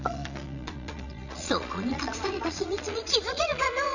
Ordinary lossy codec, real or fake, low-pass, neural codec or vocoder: none; fake; 7.2 kHz; vocoder, 22.05 kHz, 80 mel bands, Vocos